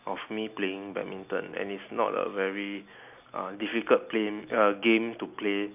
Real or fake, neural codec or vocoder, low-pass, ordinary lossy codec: real; none; 3.6 kHz; none